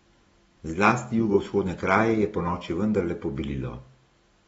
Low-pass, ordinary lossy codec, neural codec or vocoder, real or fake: 19.8 kHz; AAC, 24 kbps; none; real